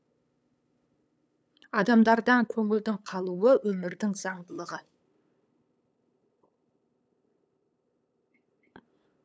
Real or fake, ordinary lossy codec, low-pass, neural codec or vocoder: fake; none; none; codec, 16 kHz, 8 kbps, FunCodec, trained on LibriTTS, 25 frames a second